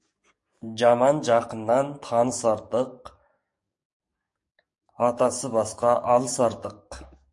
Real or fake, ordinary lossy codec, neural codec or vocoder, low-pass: fake; MP3, 48 kbps; codec, 44.1 kHz, 7.8 kbps, DAC; 10.8 kHz